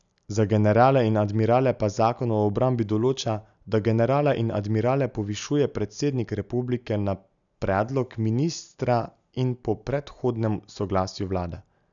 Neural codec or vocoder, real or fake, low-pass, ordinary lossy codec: none; real; 7.2 kHz; none